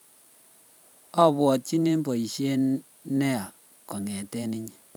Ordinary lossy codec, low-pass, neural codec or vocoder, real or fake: none; none; vocoder, 44.1 kHz, 128 mel bands every 512 samples, BigVGAN v2; fake